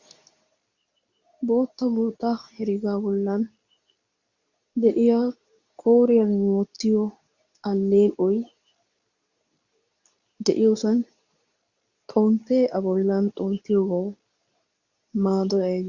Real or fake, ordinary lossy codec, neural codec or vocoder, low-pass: fake; Opus, 64 kbps; codec, 24 kHz, 0.9 kbps, WavTokenizer, medium speech release version 2; 7.2 kHz